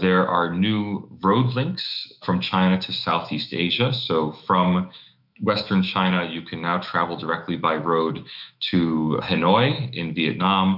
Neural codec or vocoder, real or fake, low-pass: autoencoder, 48 kHz, 128 numbers a frame, DAC-VAE, trained on Japanese speech; fake; 5.4 kHz